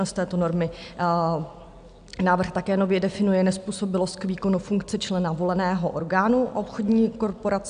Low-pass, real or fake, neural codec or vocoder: 9.9 kHz; real; none